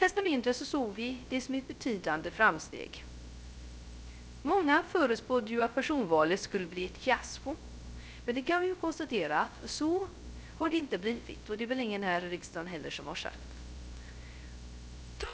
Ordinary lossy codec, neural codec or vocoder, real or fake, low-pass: none; codec, 16 kHz, 0.3 kbps, FocalCodec; fake; none